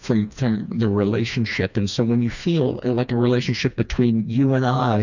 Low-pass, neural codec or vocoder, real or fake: 7.2 kHz; codec, 16 kHz, 2 kbps, FreqCodec, smaller model; fake